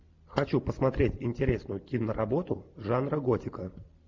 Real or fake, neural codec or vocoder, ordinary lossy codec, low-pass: real; none; MP3, 64 kbps; 7.2 kHz